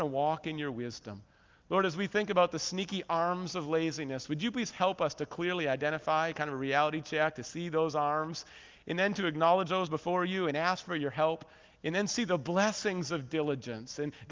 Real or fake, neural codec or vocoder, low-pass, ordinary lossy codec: real; none; 7.2 kHz; Opus, 24 kbps